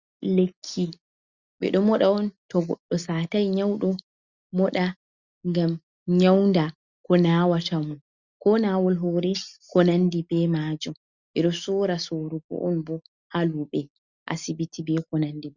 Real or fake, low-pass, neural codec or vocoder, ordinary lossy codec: real; 7.2 kHz; none; Opus, 64 kbps